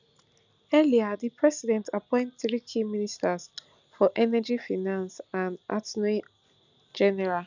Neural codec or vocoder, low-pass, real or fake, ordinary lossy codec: none; 7.2 kHz; real; none